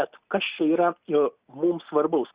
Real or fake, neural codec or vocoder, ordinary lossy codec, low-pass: real; none; Opus, 64 kbps; 3.6 kHz